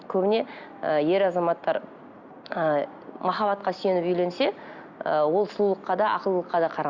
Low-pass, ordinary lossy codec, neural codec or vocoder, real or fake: 7.2 kHz; Opus, 64 kbps; none; real